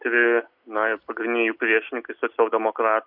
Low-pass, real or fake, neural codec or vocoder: 5.4 kHz; real; none